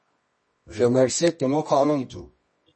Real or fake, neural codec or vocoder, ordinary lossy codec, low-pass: fake; codec, 24 kHz, 0.9 kbps, WavTokenizer, medium music audio release; MP3, 32 kbps; 10.8 kHz